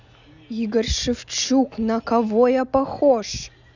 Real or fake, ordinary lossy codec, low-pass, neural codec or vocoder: real; none; 7.2 kHz; none